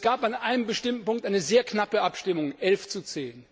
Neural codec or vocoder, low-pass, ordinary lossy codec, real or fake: none; none; none; real